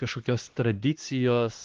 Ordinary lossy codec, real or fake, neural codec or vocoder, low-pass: Opus, 16 kbps; fake; codec, 16 kHz, 2 kbps, X-Codec, HuBERT features, trained on LibriSpeech; 7.2 kHz